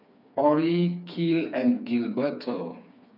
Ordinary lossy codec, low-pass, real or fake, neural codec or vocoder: none; 5.4 kHz; fake; codec, 16 kHz, 4 kbps, FreqCodec, smaller model